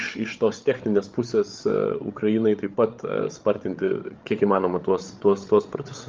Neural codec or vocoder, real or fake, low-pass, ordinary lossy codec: codec, 16 kHz, 8 kbps, FreqCodec, larger model; fake; 7.2 kHz; Opus, 24 kbps